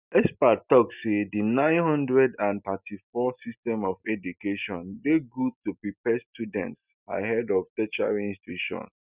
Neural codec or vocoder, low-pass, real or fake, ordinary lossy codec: none; 3.6 kHz; real; Opus, 64 kbps